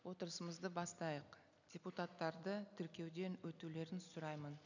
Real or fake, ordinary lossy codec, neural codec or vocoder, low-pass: real; none; none; 7.2 kHz